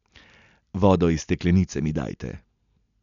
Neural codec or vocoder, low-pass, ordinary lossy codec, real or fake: none; 7.2 kHz; none; real